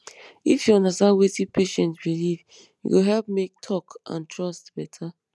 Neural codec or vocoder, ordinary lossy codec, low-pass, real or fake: none; none; none; real